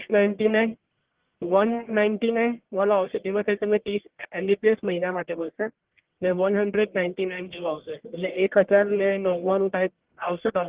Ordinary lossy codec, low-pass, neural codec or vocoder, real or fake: Opus, 16 kbps; 3.6 kHz; codec, 44.1 kHz, 1.7 kbps, Pupu-Codec; fake